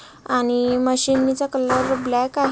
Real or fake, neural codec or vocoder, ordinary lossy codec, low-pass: real; none; none; none